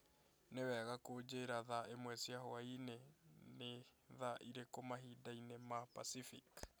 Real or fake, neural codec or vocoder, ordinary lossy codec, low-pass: real; none; none; none